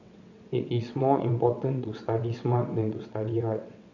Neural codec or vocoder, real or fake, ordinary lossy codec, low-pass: vocoder, 22.05 kHz, 80 mel bands, WaveNeXt; fake; none; 7.2 kHz